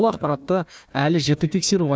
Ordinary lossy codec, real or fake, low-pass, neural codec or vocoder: none; fake; none; codec, 16 kHz, 1 kbps, FunCodec, trained on Chinese and English, 50 frames a second